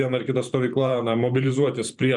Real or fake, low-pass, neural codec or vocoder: fake; 10.8 kHz; vocoder, 44.1 kHz, 128 mel bands every 512 samples, BigVGAN v2